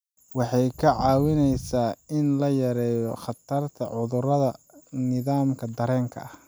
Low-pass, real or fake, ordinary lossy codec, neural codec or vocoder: none; real; none; none